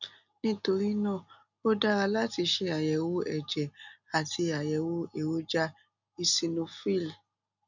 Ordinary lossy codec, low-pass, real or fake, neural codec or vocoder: none; 7.2 kHz; real; none